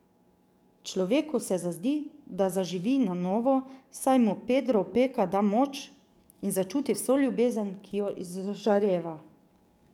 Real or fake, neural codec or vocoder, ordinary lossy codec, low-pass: fake; codec, 44.1 kHz, 7.8 kbps, DAC; none; 19.8 kHz